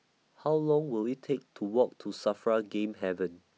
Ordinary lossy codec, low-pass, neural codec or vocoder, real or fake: none; none; none; real